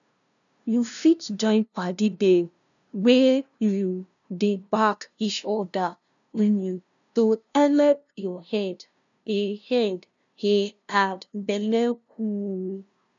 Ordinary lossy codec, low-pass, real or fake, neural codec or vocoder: none; 7.2 kHz; fake; codec, 16 kHz, 0.5 kbps, FunCodec, trained on LibriTTS, 25 frames a second